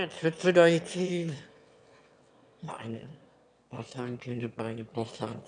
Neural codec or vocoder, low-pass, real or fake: autoencoder, 22.05 kHz, a latent of 192 numbers a frame, VITS, trained on one speaker; 9.9 kHz; fake